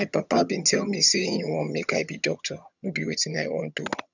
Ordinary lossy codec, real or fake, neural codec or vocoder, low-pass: none; fake; vocoder, 22.05 kHz, 80 mel bands, HiFi-GAN; 7.2 kHz